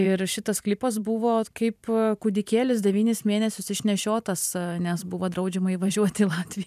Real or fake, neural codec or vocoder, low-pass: fake; vocoder, 44.1 kHz, 128 mel bands every 512 samples, BigVGAN v2; 14.4 kHz